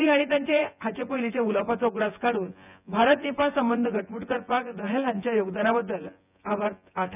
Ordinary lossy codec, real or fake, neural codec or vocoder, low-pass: none; fake; vocoder, 24 kHz, 100 mel bands, Vocos; 3.6 kHz